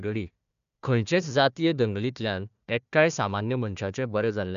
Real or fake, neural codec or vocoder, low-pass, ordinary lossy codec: fake; codec, 16 kHz, 1 kbps, FunCodec, trained on Chinese and English, 50 frames a second; 7.2 kHz; none